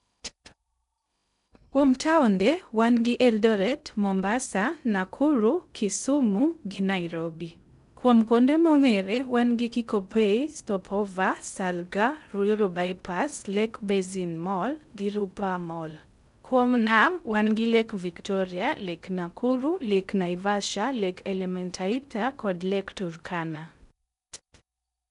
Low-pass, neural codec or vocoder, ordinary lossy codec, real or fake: 10.8 kHz; codec, 16 kHz in and 24 kHz out, 0.6 kbps, FocalCodec, streaming, 2048 codes; none; fake